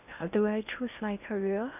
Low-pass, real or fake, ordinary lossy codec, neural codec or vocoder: 3.6 kHz; fake; none; codec, 16 kHz in and 24 kHz out, 0.8 kbps, FocalCodec, streaming, 65536 codes